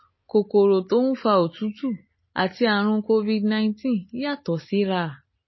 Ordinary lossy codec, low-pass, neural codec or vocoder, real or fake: MP3, 24 kbps; 7.2 kHz; none; real